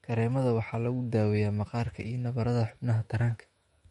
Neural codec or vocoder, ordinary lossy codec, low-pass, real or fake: autoencoder, 48 kHz, 128 numbers a frame, DAC-VAE, trained on Japanese speech; MP3, 48 kbps; 19.8 kHz; fake